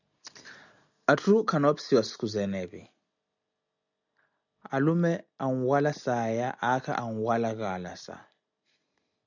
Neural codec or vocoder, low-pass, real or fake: none; 7.2 kHz; real